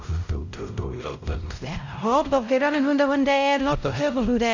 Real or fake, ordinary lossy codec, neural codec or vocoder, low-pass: fake; none; codec, 16 kHz, 0.5 kbps, X-Codec, WavLM features, trained on Multilingual LibriSpeech; 7.2 kHz